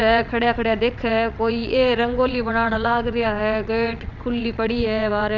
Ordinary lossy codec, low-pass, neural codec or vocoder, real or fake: none; 7.2 kHz; vocoder, 22.05 kHz, 80 mel bands, WaveNeXt; fake